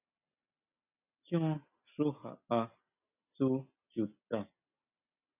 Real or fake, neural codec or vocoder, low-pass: real; none; 3.6 kHz